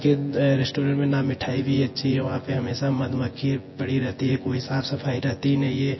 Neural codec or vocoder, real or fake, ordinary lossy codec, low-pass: vocoder, 24 kHz, 100 mel bands, Vocos; fake; MP3, 24 kbps; 7.2 kHz